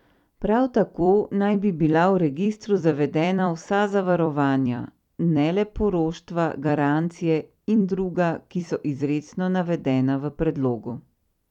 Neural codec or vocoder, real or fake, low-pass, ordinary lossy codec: vocoder, 44.1 kHz, 128 mel bands every 256 samples, BigVGAN v2; fake; 19.8 kHz; none